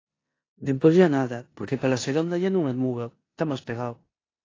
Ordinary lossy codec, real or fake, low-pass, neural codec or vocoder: AAC, 32 kbps; fake; 7.2 kHz; codec, 16 kHz in and 24 kHz out, 0.9 kbps, LongCat-Audio-Codec, four codebook decoder